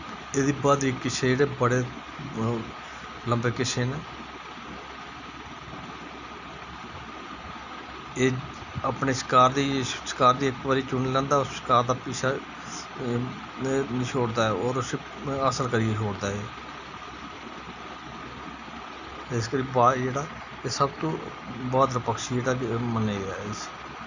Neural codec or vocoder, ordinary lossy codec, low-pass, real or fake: none; none; 7.2 kHz; real